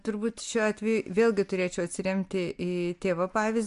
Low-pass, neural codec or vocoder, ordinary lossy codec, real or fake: 10.8 kHz; none; MP3, 64 kbps; real